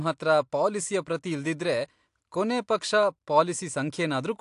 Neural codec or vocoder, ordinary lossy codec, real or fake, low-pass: none; AAC, 64 kbps; real; 10.8 kHz